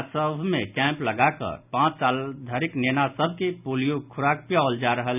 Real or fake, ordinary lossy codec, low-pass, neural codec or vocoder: real; none; 3.6 kHz; none